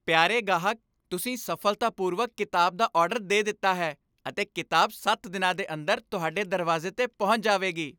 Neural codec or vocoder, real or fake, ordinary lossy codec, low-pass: none; real; none; none